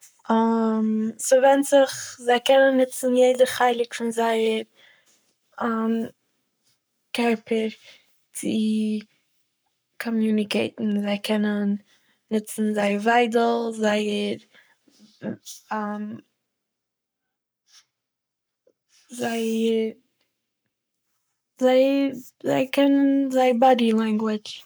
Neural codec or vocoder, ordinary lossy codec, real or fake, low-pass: codec, 44.1 kHz, 7.8 kbps, Pupu-Codec; none; fake; none